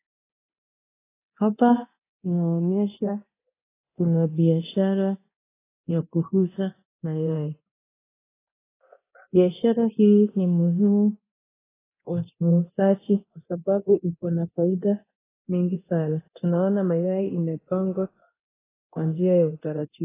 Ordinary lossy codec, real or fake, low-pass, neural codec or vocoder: AAC, 16 kbps; fake; 3.6 kHz; codec, 24 kHz, 0.9 kbps, DualCodec